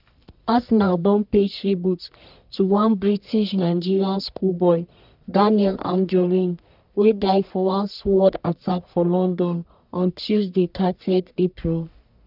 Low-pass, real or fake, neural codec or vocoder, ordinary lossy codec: 5.4 kHz; fake; codec, 44.1 kHz, 1.7 kbps, Pupu-Codec; none